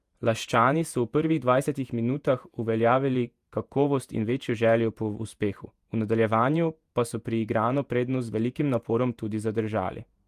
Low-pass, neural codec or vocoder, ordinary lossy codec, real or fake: 14.4 kHz; vocoder, 48 kHz, 128 mel bands, Vocos; Opus, 32 kbps; fake